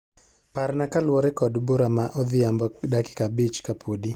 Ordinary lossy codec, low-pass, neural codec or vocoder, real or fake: Opus, 24 kbps; 19.8 kHz; none; real